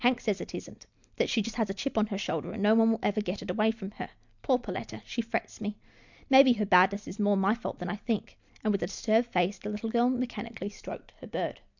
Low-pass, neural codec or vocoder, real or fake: 7.2 kHz; none; real